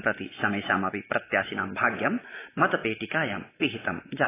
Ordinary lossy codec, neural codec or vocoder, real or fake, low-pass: AAC, 16 kbps; none; real; 3.6 kHz